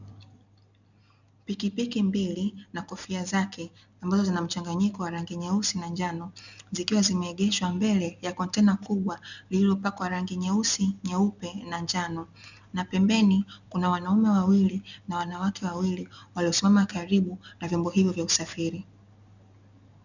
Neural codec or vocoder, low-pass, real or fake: none; 7.2 kHz; real